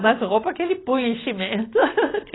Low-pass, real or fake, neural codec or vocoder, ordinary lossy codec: 7.2 kHz; real; none; AAC, 16 kbps